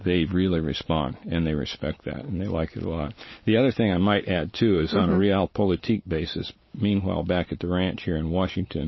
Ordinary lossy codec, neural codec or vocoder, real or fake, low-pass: MP3, 24 kbps; none; real; 7.2 kHz